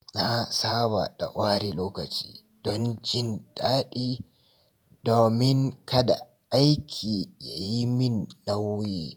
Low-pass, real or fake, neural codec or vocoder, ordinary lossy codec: none; fake; vocoder, 48 kHz, 128 mel bands, Vocos; none